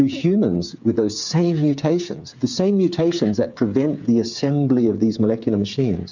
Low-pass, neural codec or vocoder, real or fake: 7.2 kHz; codec, 16 kHz, 8 kbps, FreqCodec, smaller model; fake